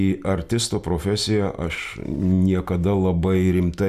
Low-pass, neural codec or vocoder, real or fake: 14.4 kHz; none; real